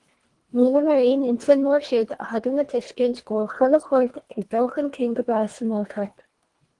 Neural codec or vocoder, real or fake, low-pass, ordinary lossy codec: codec, 24 kHz, 1.5 kbps, HILCodec; fake; 10.8 kHz; Opus, 24 kbps